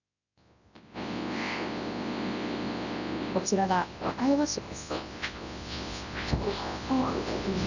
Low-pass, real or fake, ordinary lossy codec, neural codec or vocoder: 7.2 kHz; fake; none; codec, 24 kHz, 0.9 kbps, WavTokenizer, large speech release